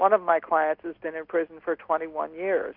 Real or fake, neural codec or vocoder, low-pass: real; none; 5.4 kHz